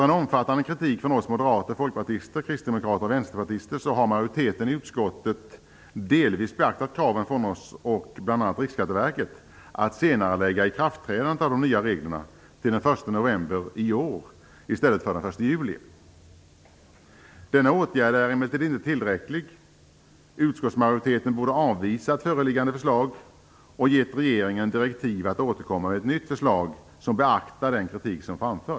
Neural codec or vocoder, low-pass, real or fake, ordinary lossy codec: none; none; real; none